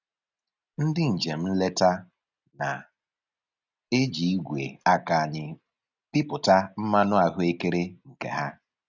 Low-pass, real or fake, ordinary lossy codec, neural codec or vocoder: 7.2 kHz; real; none; none